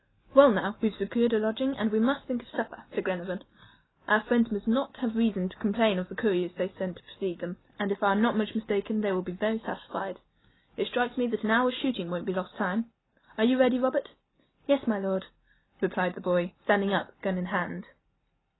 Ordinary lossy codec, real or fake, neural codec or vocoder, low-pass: AAC, 16 kbps; real; none; 7.2 kHz